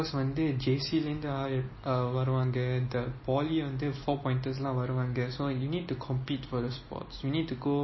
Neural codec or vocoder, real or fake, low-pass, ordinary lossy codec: none; real; 7.2 kHz; MP3, 24 kbps